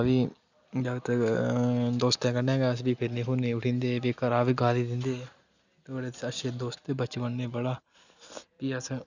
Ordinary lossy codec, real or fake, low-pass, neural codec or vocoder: none; real; 7.2 kHz; none